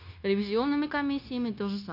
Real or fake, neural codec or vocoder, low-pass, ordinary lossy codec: fake; codec, 16 kHz, 0.9 kbps, LongCat-Audio-Codec; 5.4 kHz; none